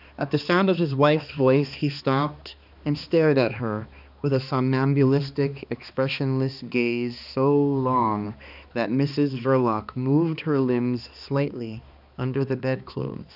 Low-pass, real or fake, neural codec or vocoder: 5.4 kHz; fake; codec, 16 kHz, 2 kbps, X-Codec, HuBERT features, trained on balanced general audio